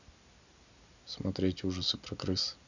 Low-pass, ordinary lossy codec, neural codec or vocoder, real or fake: 7.2 kHz; none; none; real